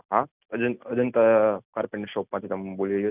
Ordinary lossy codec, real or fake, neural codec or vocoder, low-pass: none; real; none; 3.6 kHz